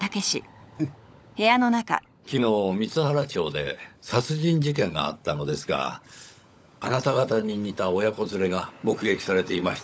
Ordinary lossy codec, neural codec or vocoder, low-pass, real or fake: none; codec, 16 kHz, 16 kbps, FunCodec, trained on LibriTTS, 50 frames a second; none; fake